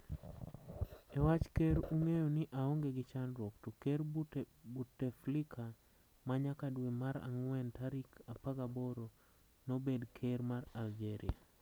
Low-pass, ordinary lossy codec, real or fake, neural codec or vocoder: none; none; real; none